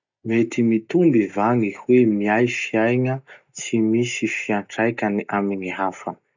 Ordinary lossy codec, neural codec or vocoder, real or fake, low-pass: none; none; real; 7.2 kHz